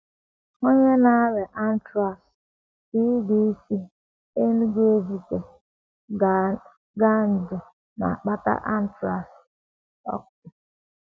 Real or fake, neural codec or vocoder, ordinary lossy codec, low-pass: real; none; none; none